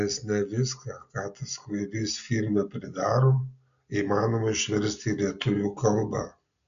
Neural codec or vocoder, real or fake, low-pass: none; real; 7.2 kHz